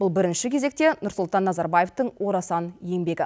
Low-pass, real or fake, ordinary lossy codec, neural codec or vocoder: none; real; none; none